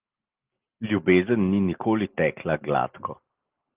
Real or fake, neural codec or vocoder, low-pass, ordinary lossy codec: real; none; 3.6 kHz; Opus, 24 kbps